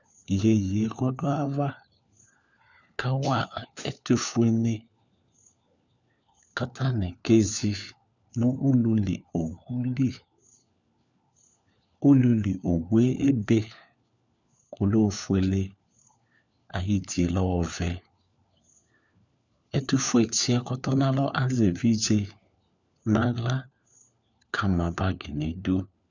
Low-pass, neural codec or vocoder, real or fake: 7.2 kHz; codec, 16 kHz, 4 kbps, FunCodec, trained on LibriTTS, 50 frames a second; fake